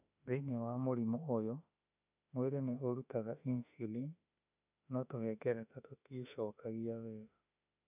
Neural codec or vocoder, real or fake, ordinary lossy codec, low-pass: autoencoder, 48 kHz, 32 numbers a frame, DAC-VAE, trained on Japanese speech; fake; none; 3.6 kHz